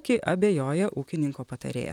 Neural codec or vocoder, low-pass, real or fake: vocoder, 44.1 kHz, 128 mel bands, Pupu-Vocoder; 19.8 kHz; fake